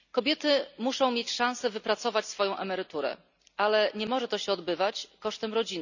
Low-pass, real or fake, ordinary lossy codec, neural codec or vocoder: 7.2 kHz; real; none; none